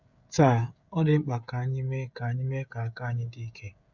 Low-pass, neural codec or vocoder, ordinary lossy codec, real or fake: 7.2 kHz; codec, 16 kHz, 16 kbps, FreqCodec, smaller model; none; fake